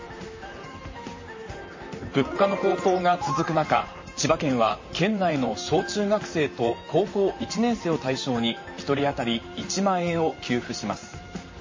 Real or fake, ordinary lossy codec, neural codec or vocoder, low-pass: fake; MP3, 32 kbps; vocoder, 44.1 kHz, 128 mel bands, Pupu-Vocoder; 7.2 kHz